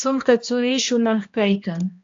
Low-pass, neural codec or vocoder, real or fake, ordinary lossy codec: 7.2 kHz; codec, 16 kHz, 1 kbps, X-Codec, HuBERT features, trained on balanced general audio; fake; AAC, 64 kbps